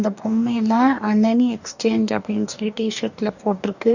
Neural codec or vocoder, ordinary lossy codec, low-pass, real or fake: codec, 44.1 kHz, 2.6 kbps, DAC; none; 7.2 kHz; fake